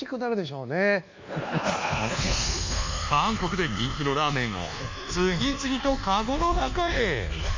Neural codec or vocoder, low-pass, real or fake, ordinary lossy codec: codec, 24 kHz, 1.2 kbps, DualCodec; 7.2 kHz; fake; MP3, 64 kbps